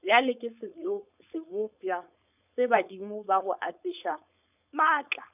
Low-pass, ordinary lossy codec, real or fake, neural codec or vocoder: 3.6 kHz; none; fake; codec, 16 kHz, 4.8 kbps, FACodec